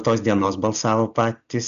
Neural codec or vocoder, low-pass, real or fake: none; 7.2 kHz; real